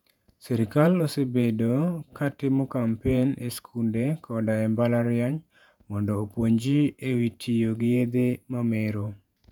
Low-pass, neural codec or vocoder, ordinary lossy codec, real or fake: 19.8 kHz; vocoder, 48 kHz, 128 mel bands, Vocos; none; fake